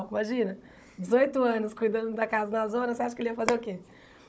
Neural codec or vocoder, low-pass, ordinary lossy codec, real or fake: codec, 16 kHz, 16 kbps, FunCodec, trained on Chinese and English, 50 frames a second; none; none; fake